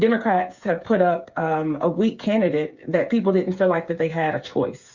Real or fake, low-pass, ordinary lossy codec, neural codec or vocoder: fake; 7.2 kHz; Opus, 64 kbps; codec, 16 kHz, 8 kbps, FreqCodec, smaller model